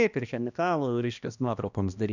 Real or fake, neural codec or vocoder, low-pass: fake; codec, 16 kHz, 1 kbps, X-Codec, HuBERT features, trained on balanced general audio; 7.2 kHz